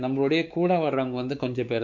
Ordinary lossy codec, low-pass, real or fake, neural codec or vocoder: none; 7.2 kHz; fake; codec, 16 kHz, 4 kbps, X-Codec, WavLM features, trained on Multilingual LibriSpeech